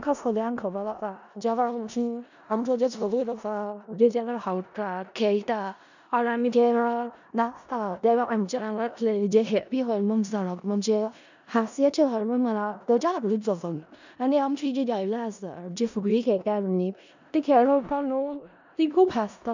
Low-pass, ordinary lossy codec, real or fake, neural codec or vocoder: 7.2 kHz; none; fake; codec, 16 kHz in and 24 kHz out, 0.4 kbps, LongCat-Audio-Codec, four codebook decoder